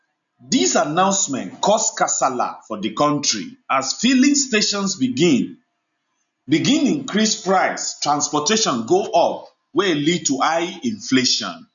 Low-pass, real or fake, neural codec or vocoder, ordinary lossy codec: 7.2 kHz; real; none; none